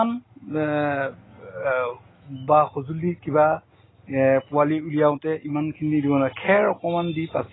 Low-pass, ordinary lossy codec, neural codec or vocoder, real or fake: 7.2 kHz; AAC, 16 kbps; none; real